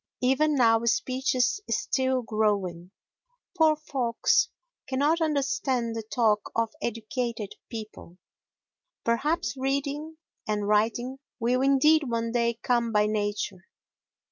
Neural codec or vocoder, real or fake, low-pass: none; real; 7.2 kHz